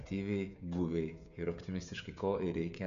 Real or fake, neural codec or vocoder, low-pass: fake; codec, 16 kHz, 16 kbps, FreqCodec, smaller model; 7.2 kHz